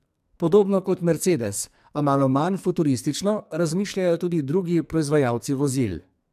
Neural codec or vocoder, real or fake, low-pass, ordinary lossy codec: codec, 44.1 kHz, 2.6 kbps, SNAC; fake; 14.4 kHz; MP3, 96 kbps